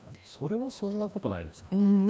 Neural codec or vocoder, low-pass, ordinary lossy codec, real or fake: codec, 16 kHz, 1 kbps, FreqCodec, larger model; none; none; fake